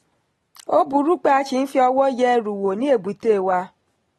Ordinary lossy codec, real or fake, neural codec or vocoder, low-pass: AAC, 32 kbps; real; none; 19.8 kHz